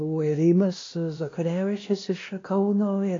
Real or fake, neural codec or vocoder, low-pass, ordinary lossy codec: fake; codec, 16 kHz, 1 kbps, X-Codec, WavLM features, trained on Multilingual LibriSpeech; 7.2 kHz; AAC, 32 kbps